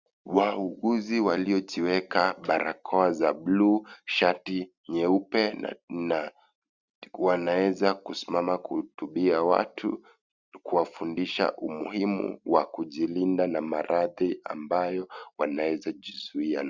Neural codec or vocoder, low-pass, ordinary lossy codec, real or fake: none; 7.2 kHz; Opus, 64 kbps; real